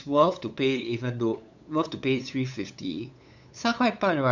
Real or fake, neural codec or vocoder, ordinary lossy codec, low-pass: fake; codec, 16 kHz, 8 kbps, FunCodec, trained on LibriTTS, 25 frames a second; none; 7.2 kHz